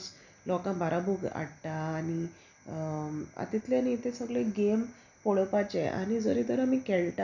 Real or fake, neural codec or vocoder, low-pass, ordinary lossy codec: real; none; 7.2 kHz; none